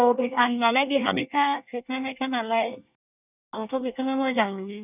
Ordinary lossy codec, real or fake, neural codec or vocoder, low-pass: none; fake; codec, 24 kHz, 1 kbps, SNAC; 3.6 kHz